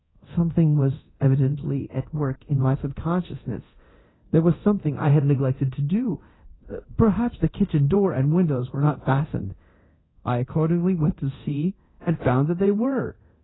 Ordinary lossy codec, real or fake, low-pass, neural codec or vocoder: AAC, 16 kbps; fake; 7.2 kHz; codec, 24 kHz, 0.9 kbps, DualCodec